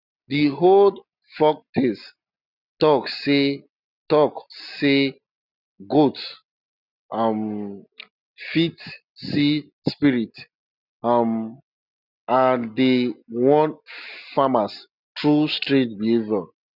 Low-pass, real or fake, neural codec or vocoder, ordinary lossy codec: 5.4 kHz; real; none; none